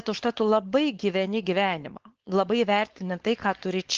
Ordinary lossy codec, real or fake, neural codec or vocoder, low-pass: Opus, 16 kbps; fake; codec, 16 kHz, 4.8 kbps, FACodec; 7.2 kHz